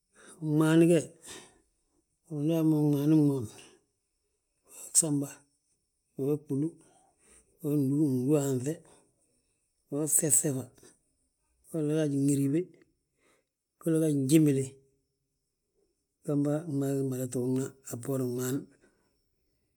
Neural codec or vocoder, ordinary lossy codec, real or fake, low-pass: none; none; real; none